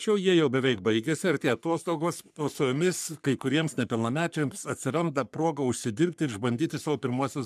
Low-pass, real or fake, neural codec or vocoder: 14.4 kHz; fake; codec, 44.1 kHz, 3.4 kbps, Pupu-Codec